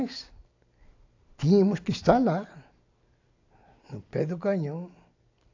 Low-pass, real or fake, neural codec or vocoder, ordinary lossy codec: 7.2 kHz; real; none; AAC, 48 kbps